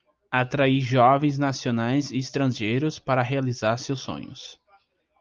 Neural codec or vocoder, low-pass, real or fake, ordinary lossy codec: none; 7.2 kHz; real; Opus, 24 kbps